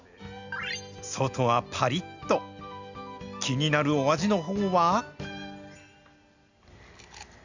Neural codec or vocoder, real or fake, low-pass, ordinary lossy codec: none; real; 7.2 kHz; Opus, 64 kbps